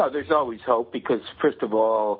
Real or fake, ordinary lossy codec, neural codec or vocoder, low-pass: real; MP3, 32 kbps; none; 5.4 kHz